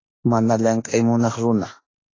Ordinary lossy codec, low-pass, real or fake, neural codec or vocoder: AAC, 32 kbps; 7.2 kHz; fake; autoencoder, 48 kHz, 32 numbers a frame, DAC-VAE, trained on Japanese speech